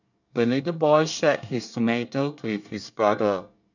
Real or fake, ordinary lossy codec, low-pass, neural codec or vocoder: fake; none; 7.2 kHz; codec, 24 kHz, 1 kbps, SNAC